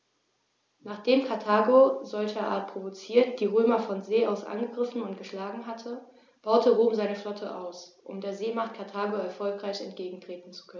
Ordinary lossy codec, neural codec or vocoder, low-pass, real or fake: none; none; none; real